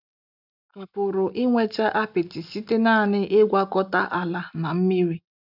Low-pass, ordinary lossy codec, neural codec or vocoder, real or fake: 5.4 kHz; none; none; real